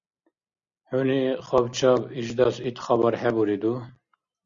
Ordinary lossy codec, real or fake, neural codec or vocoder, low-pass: Opus, 64 kbps; real; none; 7.2 kHz